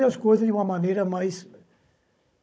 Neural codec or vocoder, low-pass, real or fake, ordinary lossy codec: codec, 16 kHz, 16 kbps, FunCodec, trained on Chinese and English, 50 frames a second; none; fake; none